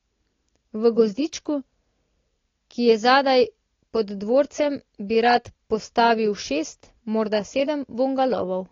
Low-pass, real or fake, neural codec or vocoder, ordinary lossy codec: 7.2 kHz; real; none; AAC, 32 kbps